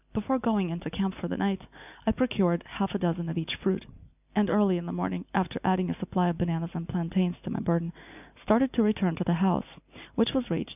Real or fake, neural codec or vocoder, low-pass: real; none; 3.6 kHz